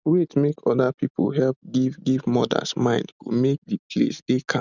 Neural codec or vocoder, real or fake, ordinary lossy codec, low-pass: none; real; none; 7.2 kHz